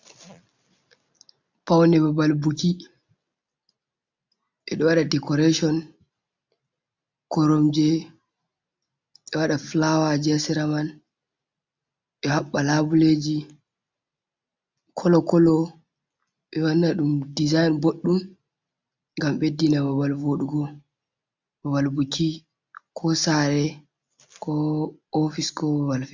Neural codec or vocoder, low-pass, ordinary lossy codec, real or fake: none; 7.2 kHz; MP3, 64 kbps; real